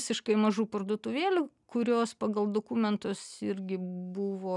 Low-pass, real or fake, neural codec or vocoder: 10.8 kHz; real; none